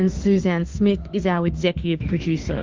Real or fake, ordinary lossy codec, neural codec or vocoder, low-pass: fake; Opus, 32 kbps; autoencoder, 48 kHz, 32 numbers a frame, DAC-VAE, trained on Japanese speech; 7.2 kHz